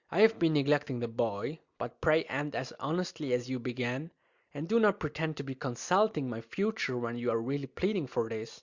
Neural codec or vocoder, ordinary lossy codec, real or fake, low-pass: none; Opus, 64 kbps; real; 7.2 kHz